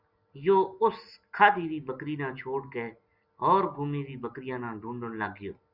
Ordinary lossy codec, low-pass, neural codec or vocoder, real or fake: Opus, 64 kbps; 5.4 kHz; none; real